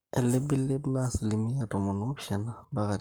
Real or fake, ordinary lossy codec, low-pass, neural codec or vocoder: fake; none; none; codec, 44.1 kHz, 7.8 kbps, Pupu-Codec